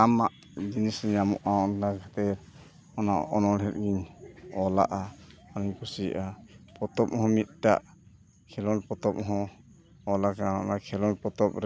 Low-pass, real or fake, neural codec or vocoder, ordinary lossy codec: none; real; none; none